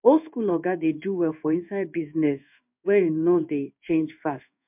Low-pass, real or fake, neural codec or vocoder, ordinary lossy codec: 3.6 kHz; fake; codec, 16 kHz in and 24 kHz out, 1 kbps, XY-Tokenizer; MP3, 32 kbps